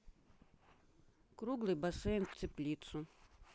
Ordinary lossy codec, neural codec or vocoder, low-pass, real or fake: none; none; none; real